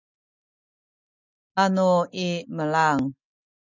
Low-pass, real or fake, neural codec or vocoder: 7.2 kHz; real; none